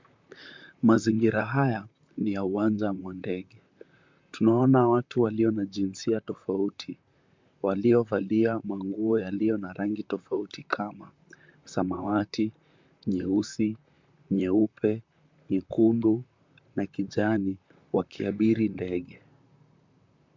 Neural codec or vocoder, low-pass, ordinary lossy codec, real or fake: vocoder, 44.1 kHz, 128 mel bands, Pupu-Vocoder; 7.2 kHz; MP3, 64 kbps; fake